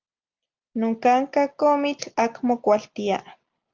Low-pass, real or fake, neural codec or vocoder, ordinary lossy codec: 7.2 kHz; real; none; Opus, 32 kbps